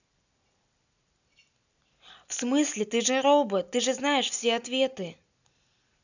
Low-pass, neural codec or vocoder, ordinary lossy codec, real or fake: 7.2 kHz; none; none; real